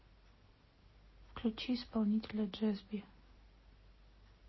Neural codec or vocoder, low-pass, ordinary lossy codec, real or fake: none; 7.2 kHz; MP3, 24 kbps; real